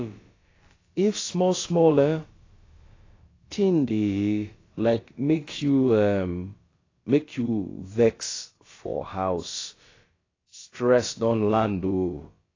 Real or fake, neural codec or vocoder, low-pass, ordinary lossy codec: fake; codec, 16 kHz, about 1 kbps, DyCAST, with the encoder's durations; 7.2 kHz; AAC, 32 kbps